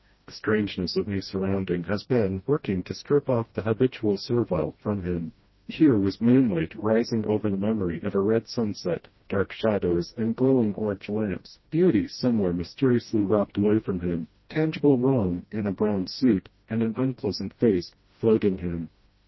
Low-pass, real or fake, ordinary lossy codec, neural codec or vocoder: 7.2 kHz; fake; MP3, 24 kbps; codec, 16 kHz, 1 kbps, FreqCodec, smaller model